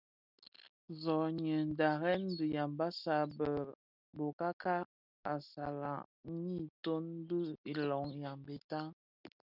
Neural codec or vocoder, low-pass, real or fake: none; 5.4 kHz; real